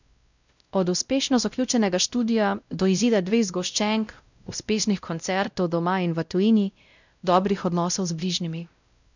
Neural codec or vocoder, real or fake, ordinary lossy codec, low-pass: codec, 16 kHz, 0.5 kbps, X-Codec, WavLM features, trained on Multilingual LibriSpeech; fake; none; 7.2 kHz